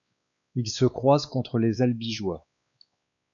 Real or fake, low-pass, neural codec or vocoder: fake; 7.2 kHz; codec, 16 kHz, 2 kbps, X-Codec, WavLM features, trained on Multilingual LibriSpeech